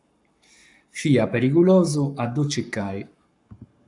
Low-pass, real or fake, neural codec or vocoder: 10.8 kHz; fake; codec, 44.1 kHz, 7.8 kbps, Pupu-Codec